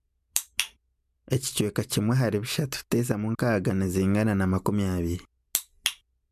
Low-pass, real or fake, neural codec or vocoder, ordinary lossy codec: 14.4 kHz; real; none; MP3, 96 kbps